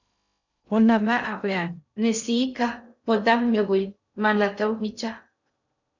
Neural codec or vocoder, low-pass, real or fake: codec, 16 kHz in and 24 kHz out, 0.6 kbps, FocalCodec, streaming, 2048 codes; 7.2 kHz; fake